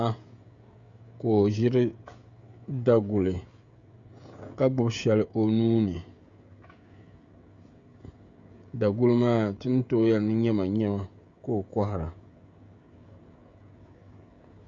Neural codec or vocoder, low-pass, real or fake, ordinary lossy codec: codec, 16 kHz, 16 kbps, FreqCodec, smaller model; 7.2 kHz; fake; Opus, 64 kbps